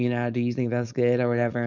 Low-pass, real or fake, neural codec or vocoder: 7.2 kHz; fake; codec, 16 kHz, 4.8 kbps, FACodec